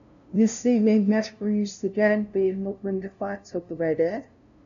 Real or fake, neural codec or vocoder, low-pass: fake; codec, 16 kHz, 0.5 kbps, FunCodec, trained on LibriTTS, 25 frames a second; 7.2 kHz